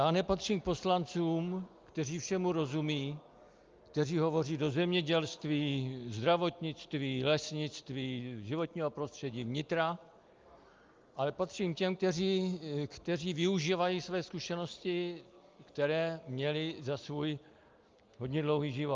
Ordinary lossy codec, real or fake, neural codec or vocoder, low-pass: Opus, 24 kbps; real; none; 7.2 kHz